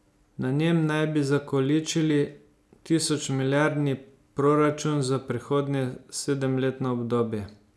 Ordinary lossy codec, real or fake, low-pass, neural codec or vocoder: none; real; none; none